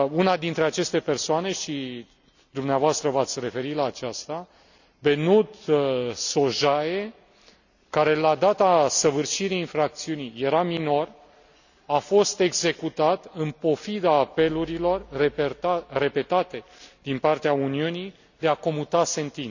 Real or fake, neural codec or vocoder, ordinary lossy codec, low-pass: real; none; none; 7.2 kHz